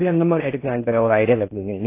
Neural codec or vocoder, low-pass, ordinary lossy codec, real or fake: codec, 16 kHz in and 24 kHz out, 0.6 kbps, FocalCodec, streaming, 4096 codes; 3.6 kHz; AAC, 24 kbps; fake